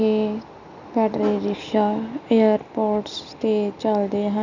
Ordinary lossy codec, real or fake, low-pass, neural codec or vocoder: none; real; 7.2 kHz; none